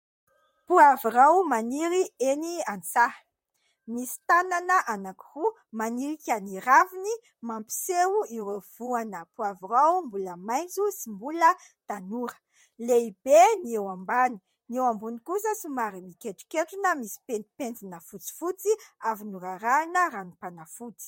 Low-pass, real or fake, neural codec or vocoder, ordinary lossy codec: 19.8 kHz; fake; vocoder, 44.1 kHz, 128 mel bands, Pupu-Vocoder; MP3, 64 kbps